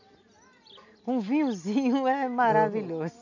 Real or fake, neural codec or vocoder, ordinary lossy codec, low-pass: real; none; none; 7.2 kHz